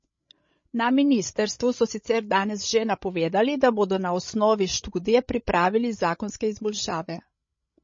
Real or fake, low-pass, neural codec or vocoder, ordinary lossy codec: fake; 7.2 kHz; codec, 16 kHz, 16 kbps, FreqCodec, larger model; MP3, 32 kbps